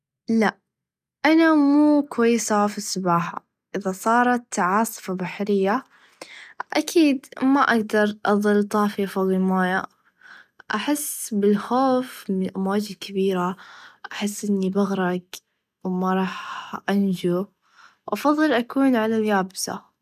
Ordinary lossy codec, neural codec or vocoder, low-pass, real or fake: none; none; 14.4 kHz; real